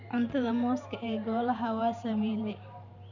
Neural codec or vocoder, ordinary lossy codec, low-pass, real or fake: vocoder, 44.1 kHz, 128 mel bands every 512 samples, BigVGAN v2; none; 7.2 kHz; fake